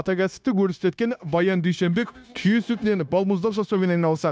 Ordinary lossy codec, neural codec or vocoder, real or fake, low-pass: none; codec, 16 kHz, 0.9 kbps, LongCat-Audio-Codec; fake; none